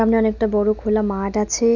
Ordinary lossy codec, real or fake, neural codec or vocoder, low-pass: none; real; none; 7.2 kHz